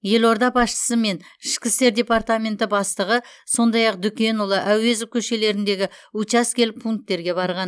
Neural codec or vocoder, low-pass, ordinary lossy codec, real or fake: none; none; none; real